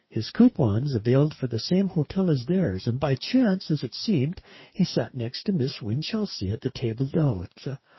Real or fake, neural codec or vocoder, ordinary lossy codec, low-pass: fake; codec, 44.1 kHz, 2.6 kbps, DAC; MP3, 24 kbps; 7.2 kHz